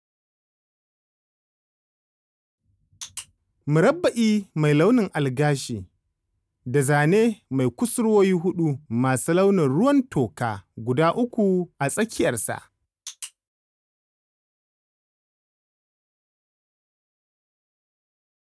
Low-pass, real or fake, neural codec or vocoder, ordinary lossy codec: none; real; none; none